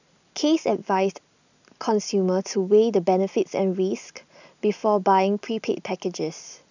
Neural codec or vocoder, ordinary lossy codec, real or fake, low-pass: none; none; real; 7.2 kHz